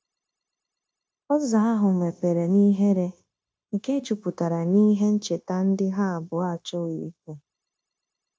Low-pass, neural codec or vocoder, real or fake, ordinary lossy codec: none; codec, 16 kHz, 0.9 kbps, LongCat-Audio-Codec; fake; none